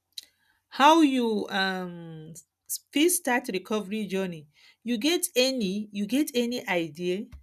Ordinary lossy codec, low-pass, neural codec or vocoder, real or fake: none; 14.4 kHz; none; real